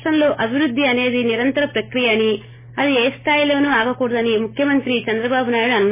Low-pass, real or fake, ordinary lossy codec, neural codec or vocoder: 3.6 kHz; real; MP3, 16 kbps; none